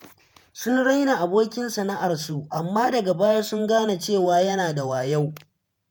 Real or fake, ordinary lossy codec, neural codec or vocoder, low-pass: fake; none; vocoder, 48 kHz, 128 mel bands, Vocos; none